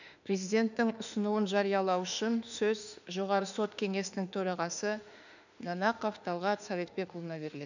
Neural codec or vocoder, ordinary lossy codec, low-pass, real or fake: autoencoder, 48 kHz, 32 numbers a frame, DAC-VAE, trained on Japanese speech; none; 7.2 kHz; fake